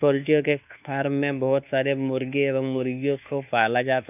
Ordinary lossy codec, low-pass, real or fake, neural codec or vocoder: none; 3.6 kHz; fake; codec, 24 kHz, 1.2 kbps, DualCodec